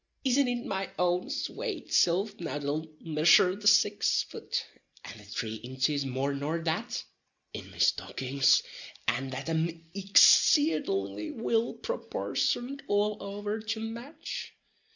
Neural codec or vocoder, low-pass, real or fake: none; 7.2 kHz; real